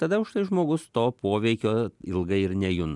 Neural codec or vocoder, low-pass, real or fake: none; 10.8 kHz; real